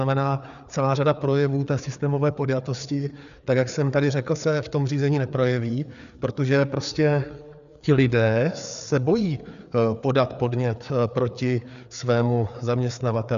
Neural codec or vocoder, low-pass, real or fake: codec, 16 kHz, 4 kbps, FreqCodec, larger model; 7.2 kHz; fake